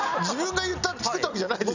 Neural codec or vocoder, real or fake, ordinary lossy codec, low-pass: none; real; none; 7.2 kHz